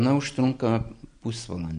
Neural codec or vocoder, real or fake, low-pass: none; real; 9.9 kHz